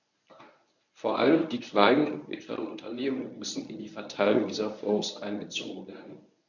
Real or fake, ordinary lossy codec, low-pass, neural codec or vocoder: fake; none; 7.2 kHz; codec, 24 kHz, 0.9 kbps, WavTokenizer, medium speech release version 1